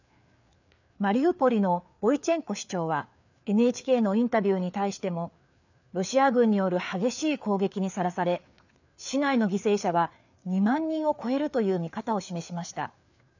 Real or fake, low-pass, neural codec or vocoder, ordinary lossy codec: fake; 7.2 kHz; codec, 16 kHz, 4 kbps, FreqCodec, larger model; none